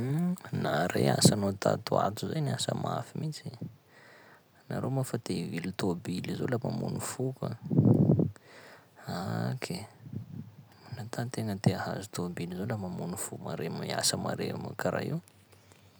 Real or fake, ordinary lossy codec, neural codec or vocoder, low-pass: real; none; none; none